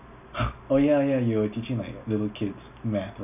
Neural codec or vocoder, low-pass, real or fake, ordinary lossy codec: none; 3.6 kHz; real; none